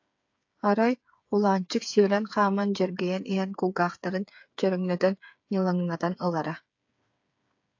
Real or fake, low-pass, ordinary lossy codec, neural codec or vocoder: fake; 7.2 kHz; AAC, 48 kbps; codec, 16 kHz, 8 kbps, FreqCodec, smaller model